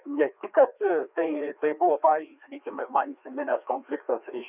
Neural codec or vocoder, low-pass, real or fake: codec, 16 kHz, 2 kbps, FreqCodec, larger model; 3.6 kHz; fake